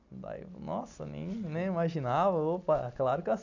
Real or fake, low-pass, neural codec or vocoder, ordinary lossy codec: real; 7.2 kHz; none; none